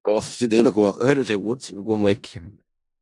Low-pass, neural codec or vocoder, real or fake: 10.8 kHz; codec, 16 kHz in and 24 kHz out, 0.4 kbps, LongCat-Audio-Codec, four codebook decoder; fake